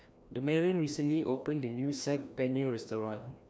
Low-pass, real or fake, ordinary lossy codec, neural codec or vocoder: none; fake; none; codec, 16 kHz, 1 kbps, FreqCodec, larger model